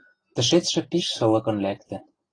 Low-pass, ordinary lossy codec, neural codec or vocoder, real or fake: 9.9 kHz; AAC, 32 kbps; none; real